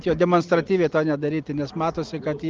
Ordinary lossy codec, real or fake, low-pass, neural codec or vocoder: Opus, 32 kbps; real; 7.2 kHz; none